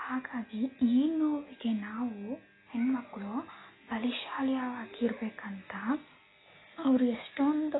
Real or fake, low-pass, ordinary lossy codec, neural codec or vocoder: real; 7.2 kHz; AAC, 16 kbps; none